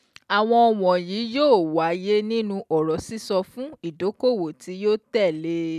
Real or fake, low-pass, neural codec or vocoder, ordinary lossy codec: real; 14.4 kHz; none; none